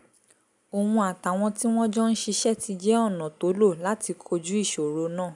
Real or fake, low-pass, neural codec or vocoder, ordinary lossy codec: real; 10.8 kHz; none; none